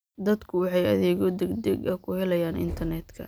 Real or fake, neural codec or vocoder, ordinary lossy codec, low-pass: real; none; none; none